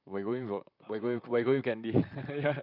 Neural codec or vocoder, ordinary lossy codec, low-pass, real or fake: vocoder, 44.1 kHz, 128 mel bands, Pupu-Vocoder; none; 5.4 kHz; fake